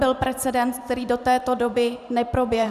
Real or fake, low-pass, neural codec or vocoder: fake; 14.4 kHz; vocoder, 44.1 kHz, 128 mel bands every 512 samples, BigVGAN v2